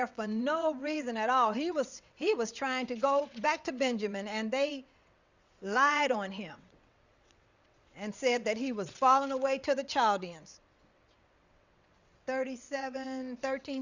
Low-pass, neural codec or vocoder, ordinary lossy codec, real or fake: 7.2 kHz; vocoder, 22.05 kHz, 80 mel bands, WaveNeXt; Opus, 64 kbps; fake